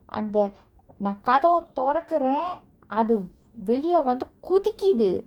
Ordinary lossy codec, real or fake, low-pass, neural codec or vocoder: MP3, 96 kbps; fake; 19.8 kHz; codec, 44.1 kHz, 2.6 kbps, DAC